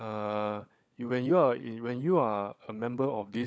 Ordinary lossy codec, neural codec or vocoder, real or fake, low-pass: none; codec, 16 kHz, 16 kbps, FunCodec, trained on LibriTTS, 50 frames a second; fake; none